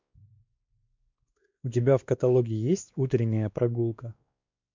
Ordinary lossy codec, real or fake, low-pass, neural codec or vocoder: MP3, 64 kbps; fake; 7.2 kHz; codec, 16 kHz, 2 kbps, X-Codec, WavLM features, trained on Multilingual LibriSpeech